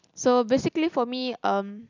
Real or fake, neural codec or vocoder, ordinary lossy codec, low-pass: fake; vocoder, 44.1 kHz, 128 mel bands every 256 samples, BigVGAN v2; none; 7.2 kHz